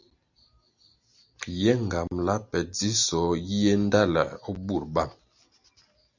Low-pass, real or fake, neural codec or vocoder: 7.2 kHz; real; none